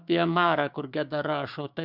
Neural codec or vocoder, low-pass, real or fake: codec, 16 kHz, 6 kbps, DAC; 5.4 kHz; fake